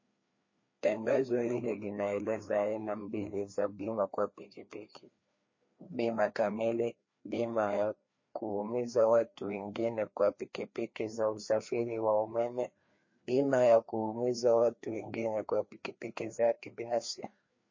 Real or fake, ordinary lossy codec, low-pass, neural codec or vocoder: fake; MP3, 32 kbps; 7.2 kHz; codec, 16 kHz, 2 kbps, FreqCodec, larger model